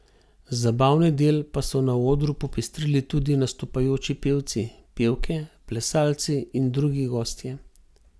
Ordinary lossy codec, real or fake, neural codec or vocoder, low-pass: none; real; none; none